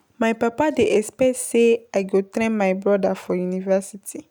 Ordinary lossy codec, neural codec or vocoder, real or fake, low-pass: none; none; real; none